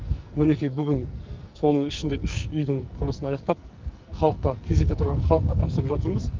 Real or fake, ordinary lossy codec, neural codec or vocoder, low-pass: fake; Opus, 24 kbps; codec, 44.1 kHz, 2.6 kbps, SNAC; 7.2 kHz